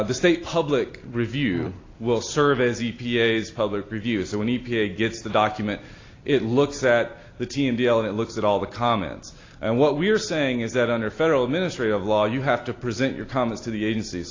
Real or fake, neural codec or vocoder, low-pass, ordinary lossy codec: real; none; 7.2 kHz; AAC, 32 kbps